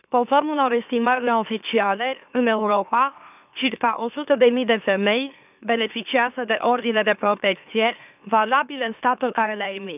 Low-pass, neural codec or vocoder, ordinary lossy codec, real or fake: 3.6 kHz; autoencoder, 44.1 kHz, a latent of 192 numbers a frame, MeloTTS; none; fake